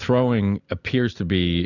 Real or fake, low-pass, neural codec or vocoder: real; 7.2 kHz; none